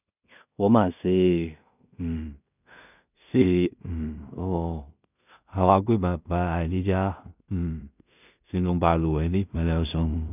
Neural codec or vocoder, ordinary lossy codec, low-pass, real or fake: codec, 16 kHz in and 24 kHz out, 0.4 kbps, LongCat-Audio-Codec, two codebook decoder; none; 3.6 kHz; fake